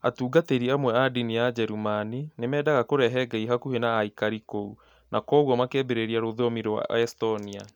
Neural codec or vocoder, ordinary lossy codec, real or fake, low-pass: none; none; real; 19.8 kHz